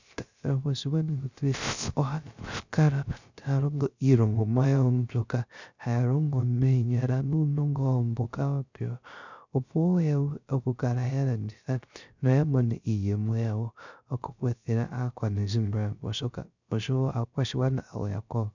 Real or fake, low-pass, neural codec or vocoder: fake; 7.2 kHz; codec, 16 kHz, 0.3 kbps, FocalCodec